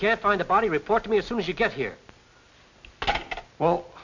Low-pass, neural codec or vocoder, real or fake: 7.2 kHz; none; real